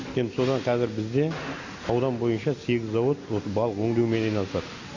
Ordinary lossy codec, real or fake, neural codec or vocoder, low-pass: AAC, 48 kbps; real; none; 7.2 kHz